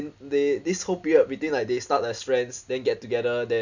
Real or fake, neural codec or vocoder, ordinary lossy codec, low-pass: real; none; none; 7.2 kHz